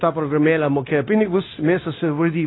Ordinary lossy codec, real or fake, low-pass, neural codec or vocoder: AAC, 16 kbps; fake; 7.2 kHz; codec, 16 kHz, 0.9 kbps, LongCat-Audio-Codec